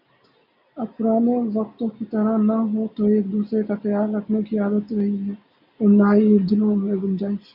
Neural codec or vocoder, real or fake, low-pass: none; real; 5.4 kHz